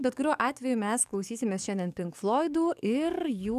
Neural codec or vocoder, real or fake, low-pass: codec, 44.1 kHz, 7.8 kbps, DAC; fake; 14.4 kHz